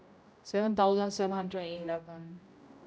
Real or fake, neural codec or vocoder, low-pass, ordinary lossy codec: fake; codec, 16 kHz, 0.5 kbps, X-Codec, HuBERT features, trained on general audio; none; none